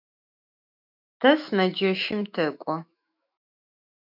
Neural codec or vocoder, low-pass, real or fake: autoencoder, 48 kHz, 128 numbers a frame, DAC-VAE, trained on Japanese speech; 5.4 kHz; fake